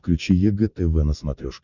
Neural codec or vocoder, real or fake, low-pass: none; real; 7.2 kHz